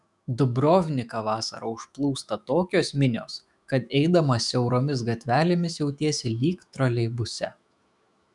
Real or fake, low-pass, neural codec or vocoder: fake; 10.8 kHz; autoencoder, 48 kHz, 128 numbers a frame, DAC-VAE, trained on Japanese speech